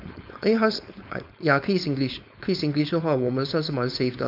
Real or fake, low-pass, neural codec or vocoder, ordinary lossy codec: fake; 5.4 kHz; codec, 16 kHz, 4.8 kbps, FACodec; none